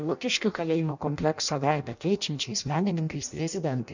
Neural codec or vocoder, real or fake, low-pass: codec, 16 kHz in and 24 kHz out, 0.6 kbps, FireRedTTS-2 codec; fake; 7.2 kHz